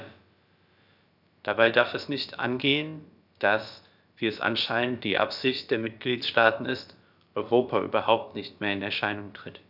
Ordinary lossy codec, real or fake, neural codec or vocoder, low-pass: none; fake; codec, 16 kHz, about 1 kbps, DyCAST, with the encoder's durations; 5.4 kHz